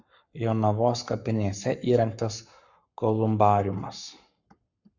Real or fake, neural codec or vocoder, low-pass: fake; codec, 44.1 kHz, 7.8 kbps, Pupu-Codec; 7.2 kHz